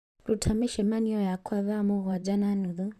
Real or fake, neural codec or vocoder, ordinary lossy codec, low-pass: fake; vocoder, 44.1 kHz, 128 mel bands, Pupu-Vocoder; none; 14.4 kHz